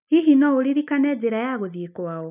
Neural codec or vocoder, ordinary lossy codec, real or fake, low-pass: none; none; real; 3.6 kHz